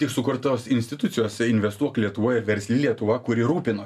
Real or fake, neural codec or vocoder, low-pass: real; none; 14.4 kHz